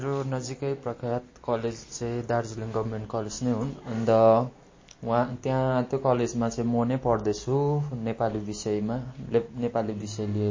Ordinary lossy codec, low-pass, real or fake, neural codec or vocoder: MP3, 32 kbps; 7.2 kHz; real; none